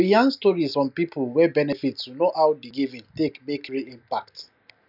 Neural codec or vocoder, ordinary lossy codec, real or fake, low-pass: none; none; real; 5.4 kHz